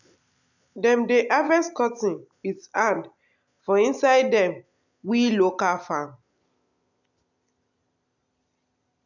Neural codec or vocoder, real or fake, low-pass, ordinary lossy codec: none; real; 7.2 kHz; none